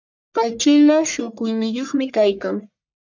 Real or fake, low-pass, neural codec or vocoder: fake; 7.2 kHz; codec, 44.1 kHz, 1.7 kbps, Pupu-Codec